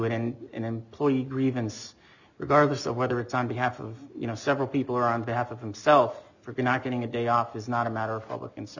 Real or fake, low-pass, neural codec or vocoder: real; 7.2 kHz; none